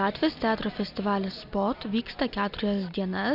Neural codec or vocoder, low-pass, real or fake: none; 5.4 kHz; real